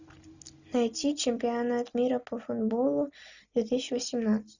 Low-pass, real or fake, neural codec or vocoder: 7.2 kHz; real; none